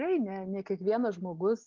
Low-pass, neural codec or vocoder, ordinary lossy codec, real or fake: 7.2 kHz; none; Opus, 32 kbps; real